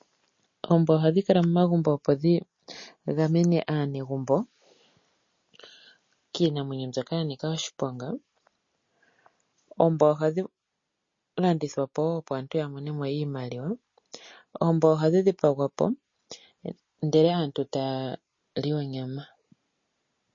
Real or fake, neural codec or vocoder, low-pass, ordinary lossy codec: real; none; 7.2 kHz; MP3, 32 kbps